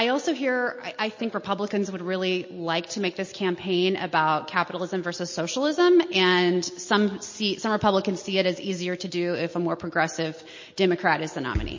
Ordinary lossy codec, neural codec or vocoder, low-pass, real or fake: MP3, 32 kbps; none; 7.2 kHz; real